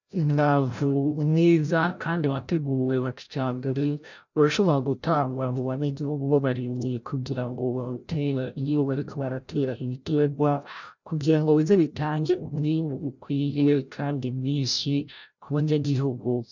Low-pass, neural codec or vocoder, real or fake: 7.2 kHz; codec, 16 kHz, 0.5 kbps, FreqCodec, larger model; fake